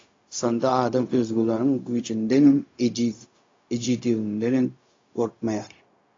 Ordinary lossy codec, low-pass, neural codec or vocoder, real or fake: AAC, 32 kbps; 7.2 kHz; codec, 16 kHz, 0.4 kbps, LongCat-Audio-Codec; fake